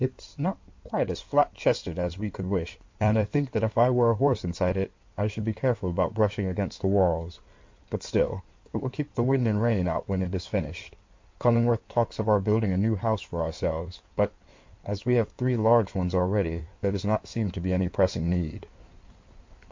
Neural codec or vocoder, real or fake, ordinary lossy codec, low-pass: codec, 16 kHz in and 24 kHz out, 2.2 kbps, FireRedTTS-2 codec; fake; MP3, 48 kbps; 7.2 kHz